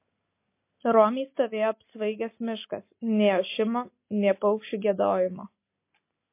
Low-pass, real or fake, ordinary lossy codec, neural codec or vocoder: 3.6 kHz; real; MP3, 24 kbps; none